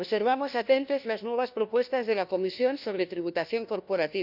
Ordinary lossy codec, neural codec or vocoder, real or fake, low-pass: none; codec, 16 kHz, 1 kbps, FunCodec, trained on LibriTTS, 50 frames a second; fake; 5.4 kHz